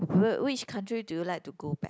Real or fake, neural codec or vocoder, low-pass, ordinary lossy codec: real; none; none; none